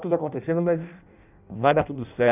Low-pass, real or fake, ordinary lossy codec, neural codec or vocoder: 3.6 kHz; fake; none; codec, 16 kHz in and 24 kHz out, 0.6 kbps, FireRedTTS-2 codec